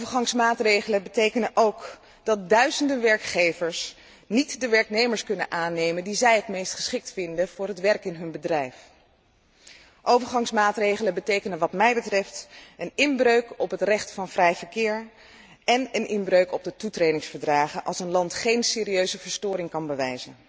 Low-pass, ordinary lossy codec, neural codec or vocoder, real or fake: none; none; none; real